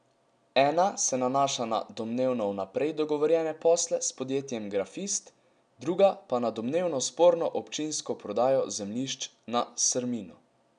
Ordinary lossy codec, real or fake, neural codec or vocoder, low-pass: none; real; none; 9.9 kHz